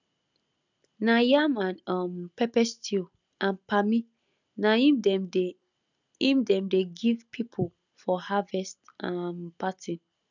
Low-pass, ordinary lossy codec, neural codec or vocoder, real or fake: 7.2 kHz; none; none; real